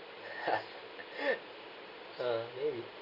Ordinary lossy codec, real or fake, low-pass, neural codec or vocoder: none; real; 5.4 kHz; none